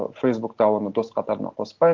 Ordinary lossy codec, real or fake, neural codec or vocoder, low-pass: Opus, 16 kbps; real; none; 7.2 kHz